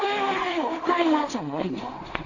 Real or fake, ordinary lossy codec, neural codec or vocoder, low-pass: fake; none; codec, 16 kHz in and 24 kHz out, 0.4 kbps, LongCat-Audio-Codec, two codebook decoder; 7.2 kHz